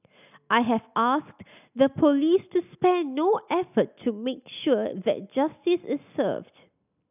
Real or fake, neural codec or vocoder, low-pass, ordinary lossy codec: real; none; 3.6 kHz; none